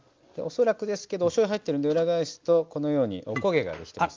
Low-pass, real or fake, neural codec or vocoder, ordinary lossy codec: 7.2 kHz; real; none; Opus, 32 kbps